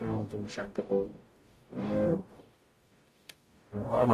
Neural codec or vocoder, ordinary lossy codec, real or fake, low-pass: codec, 44.1 kHz, 0.9 kbps, DAC; AAC, 48 kbps; fake; 14.4 kHz